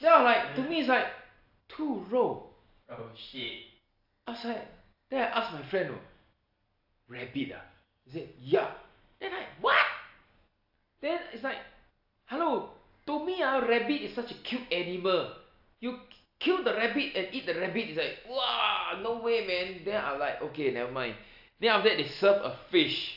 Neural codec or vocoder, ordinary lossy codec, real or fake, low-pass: none; AAC, 48 kbps; real; 5.4 kHz